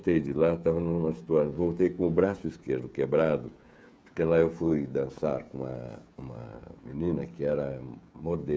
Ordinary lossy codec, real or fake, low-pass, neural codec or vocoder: none; fake; none; codec, 16 kHz, 16 kbps, FreqCodec, smaller model